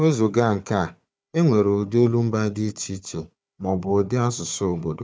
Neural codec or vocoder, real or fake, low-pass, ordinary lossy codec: codec, 16 kHz, 4 kbps, FunCodec, trained on Chinese and English, 50 frames a second; fake; none; none